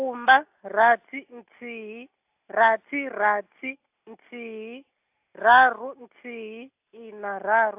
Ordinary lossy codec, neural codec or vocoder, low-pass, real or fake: AAC, 32 kbps; none; 3.6 kHz; real